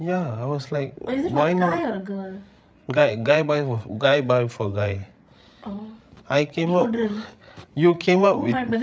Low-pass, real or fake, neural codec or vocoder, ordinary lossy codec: none; fake; codec, 16 kHz, 8 kbps, FreqCodec, larger model; none